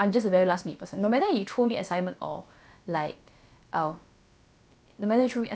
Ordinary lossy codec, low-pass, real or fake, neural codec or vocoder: none; none; fake; codec, 16 kHz, about 1 kbps, DyCAST, with the encoder's durations